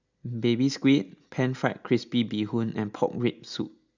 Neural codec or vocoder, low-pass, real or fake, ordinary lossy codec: none; 7.2 kHz; real; Opus, 64 kbps